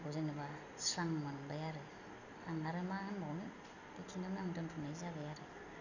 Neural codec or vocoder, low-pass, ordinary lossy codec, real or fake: none; 7.2 kHz; none; real